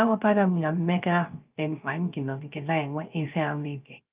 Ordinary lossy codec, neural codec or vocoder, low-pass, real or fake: Opus, 32 kbps; codec, 16 kHz, 0.3 kbps, FocalCodec; 3.6 kHz; fake